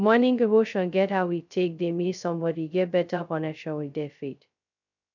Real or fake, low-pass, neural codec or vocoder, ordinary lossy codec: fake; 7.2 kHz; codec, 16 kHz, 0.2 kbps, FocalCodec; none